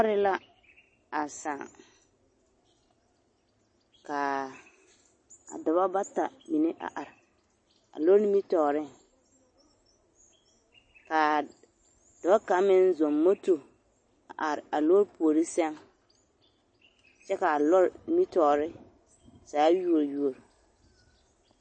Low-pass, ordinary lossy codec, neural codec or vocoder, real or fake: 9.9 kHz; MP3, 32 kbps; none; real